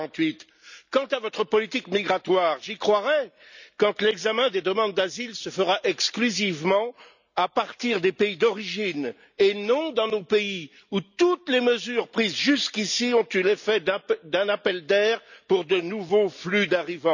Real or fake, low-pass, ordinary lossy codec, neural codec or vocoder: real; 7.2 kHz; none; none